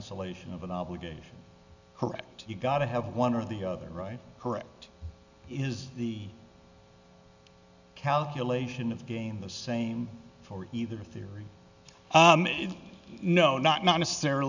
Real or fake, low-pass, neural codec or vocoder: real; 7.2 kHz; none